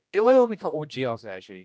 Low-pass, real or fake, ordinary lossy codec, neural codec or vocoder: none; fake; none; codec, 16 kHz, 1 kbps, X-Codec, HuBERT features, trained on general audio